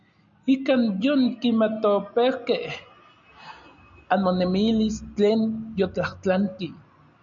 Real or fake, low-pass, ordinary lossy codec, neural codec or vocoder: real; 7.2 kHz; MP3, 96 kbps; none